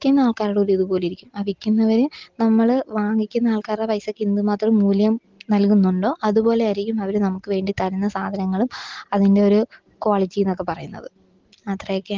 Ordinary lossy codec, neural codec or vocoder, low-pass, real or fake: Opus, 16 kbps; none; 7.2 kHz; real